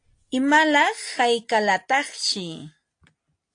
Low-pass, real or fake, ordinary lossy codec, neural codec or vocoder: 9.9 kHz; real; AAC, 48 kbps; none